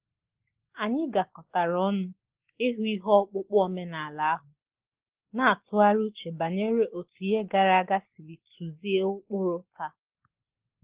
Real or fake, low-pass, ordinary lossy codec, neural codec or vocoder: real; 3.6 kHz; Opus, 16 kbps; none